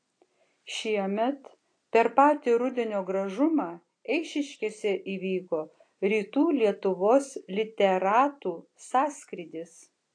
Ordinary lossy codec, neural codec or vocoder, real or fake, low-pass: AAC, 48 kbps; none; real; 9.9 kHz